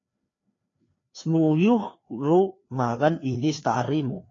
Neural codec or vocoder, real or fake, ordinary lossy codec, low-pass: codec, 16 kHz, 2 kbps, FreqCodec, larger model; fake; AAC, 32 kbps; 7.2 kHz